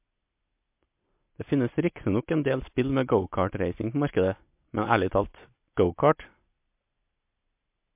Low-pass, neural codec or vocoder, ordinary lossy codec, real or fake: 3.6 kHz; none; MP3, 32 kbps; real